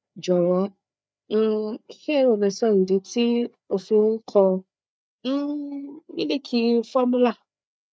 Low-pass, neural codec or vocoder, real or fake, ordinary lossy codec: none; codec, 16 kHz, 4 kbps, FreqCodec, larger model; fake; none